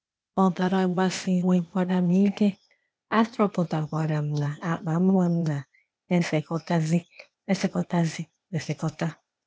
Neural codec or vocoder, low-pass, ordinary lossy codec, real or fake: codec, 16 kHz, 0.8 kbps, ZipCodec; none; none; fake